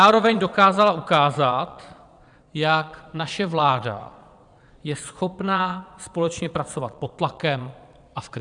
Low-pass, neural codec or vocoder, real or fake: 9.9 kHz; vocoder, 22.05 kHz, 80 mel bands, Vocos; fake